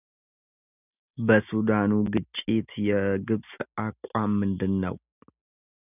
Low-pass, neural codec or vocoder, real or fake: 3.6 kHz; none; real